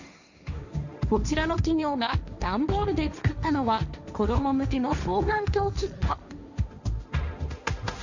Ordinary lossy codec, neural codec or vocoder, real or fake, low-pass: none; codec, 16 kHz, 1.1 kbps, Voila-Tokenizer; fake; 7.2 kHz